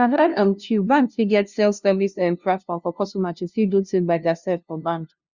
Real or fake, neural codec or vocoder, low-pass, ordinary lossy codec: fake; codec, 16 kHz, 0.5 kbps, FunCodec, trained on LibriTTS, 25 frames a second; 7.2 kHz; Opus, 64 kbps